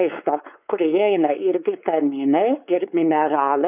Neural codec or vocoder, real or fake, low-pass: codec, 16 kHz, 4 kbps, X-Codec, WavLM features, trained on Multilingual LibriSpeech; fake; 3.6 kHz